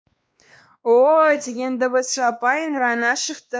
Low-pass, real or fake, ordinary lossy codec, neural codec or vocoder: none; fake; none; codec, 16 kHz, 2 kbps, X-Codec, WavLM features, trained on Multilingual LibriSpeech